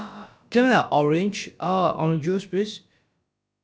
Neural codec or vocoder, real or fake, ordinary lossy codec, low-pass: codec, 16 kHz, about 1 kbps, DyCAST, with the encoder's durations; fake; none; none